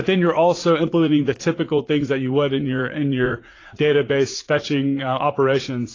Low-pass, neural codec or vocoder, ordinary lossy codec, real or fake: 7.2 kHz; vocoder, 44.1 kHz, 80 mel bands, Vocos; AAC, 32 kbps; fake